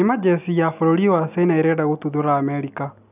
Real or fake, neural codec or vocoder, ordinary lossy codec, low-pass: real; none; none; 3.6 kHz